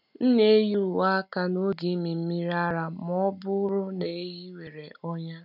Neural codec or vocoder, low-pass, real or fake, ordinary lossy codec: none; 5.4 kHz; real; none